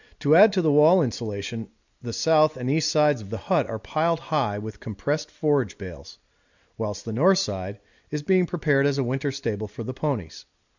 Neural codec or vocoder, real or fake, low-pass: none; real; 7.2 kHz